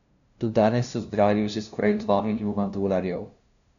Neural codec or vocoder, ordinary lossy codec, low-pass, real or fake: codec, 16 kHz, 0.5 kbps, FunCodec, trained on LibriTTS, 25 frames a second; none; 7.2 kHz; fake